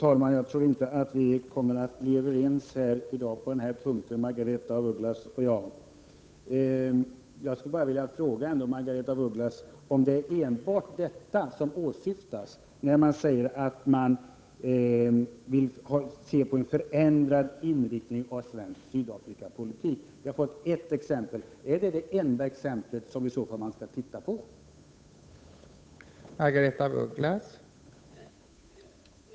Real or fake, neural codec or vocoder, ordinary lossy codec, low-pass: fake; codec, 16 kHz, 8 kbps, FunCodec, trained on Chinese and English, 25 frames a second; none; none